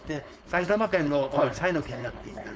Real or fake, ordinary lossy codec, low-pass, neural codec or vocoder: fake; none; none; codec, 16 kHz, 4.8 kbps, FACodec